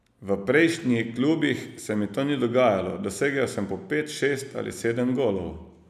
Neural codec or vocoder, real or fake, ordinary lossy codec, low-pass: none; real; none; 14.4 kHz